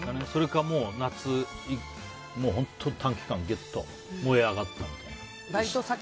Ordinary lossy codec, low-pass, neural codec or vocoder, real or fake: none; none; none; real